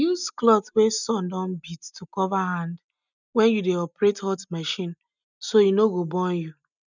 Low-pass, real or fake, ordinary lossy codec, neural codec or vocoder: 7.2 kHz; real; none; none